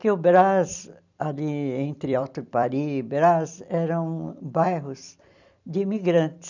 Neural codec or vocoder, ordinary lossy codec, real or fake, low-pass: vocoder, 44.1 kHz, 128 mel bands every 256 samples, BigVGAN v2; none; fake; 7.2 kHz